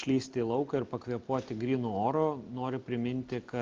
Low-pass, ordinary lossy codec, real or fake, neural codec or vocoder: 7.2 kHz; Opus, 24 kbps; real; none